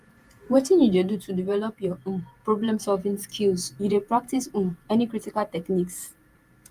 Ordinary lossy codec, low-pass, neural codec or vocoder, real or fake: Opus, 32 kbps; 14.4 kHz; vocoder, 48 kHz, 128 mel bands, Vocos; fake